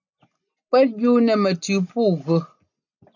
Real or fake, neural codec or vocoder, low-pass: real; none; 7.2 kHz